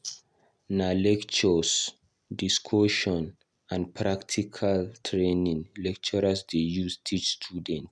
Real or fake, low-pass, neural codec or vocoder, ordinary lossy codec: real; none; none; none